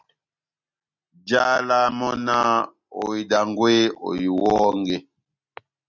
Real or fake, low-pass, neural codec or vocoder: real; 7.2 kHz; none